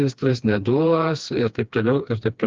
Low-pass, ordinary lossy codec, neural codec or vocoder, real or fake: 7.2 kHz; Opus, 24 kbps; codec, 16 kHz, 2 kbps, FreqCodec, smaller model; fake